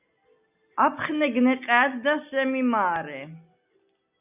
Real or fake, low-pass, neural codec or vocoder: real; 3.6 kHz; none